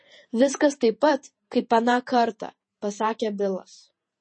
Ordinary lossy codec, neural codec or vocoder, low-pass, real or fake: MP3, 32 kbps; vocoder, 48 kHz, 128 mel bands, Vocos; 9.9 kHz; fake